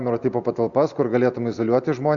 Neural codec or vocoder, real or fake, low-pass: none; real; 7.2 kHz